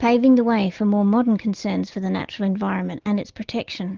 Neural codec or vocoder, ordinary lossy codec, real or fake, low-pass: none; Opus, 16 kbps; real; 7.2 kHz